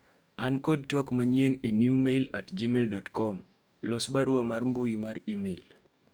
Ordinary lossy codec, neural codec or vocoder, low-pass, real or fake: none; codec, 44.1 kHz, 2.6 kbps, DAC; none; fake